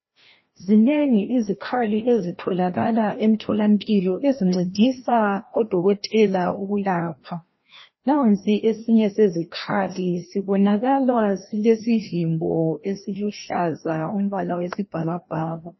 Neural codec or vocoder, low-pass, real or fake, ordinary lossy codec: codec, 16 kHz, 1 kbps, FreqCodec, larger model; 7.2 kHz; fake; MP3, 24 kbps